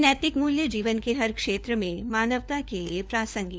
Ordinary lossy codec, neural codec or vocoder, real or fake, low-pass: none; codec, 16 kHz, 4 kbps, FunCodec, trained on LibriTTS, 50 frames a second; fake; none